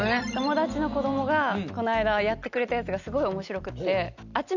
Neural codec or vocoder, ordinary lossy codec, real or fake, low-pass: none; none; real; 7.2 kHz